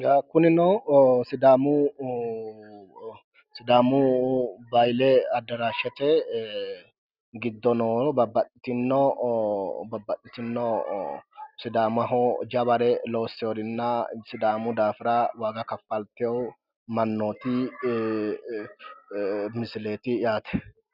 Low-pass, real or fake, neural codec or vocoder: 5.4 kHz; real; none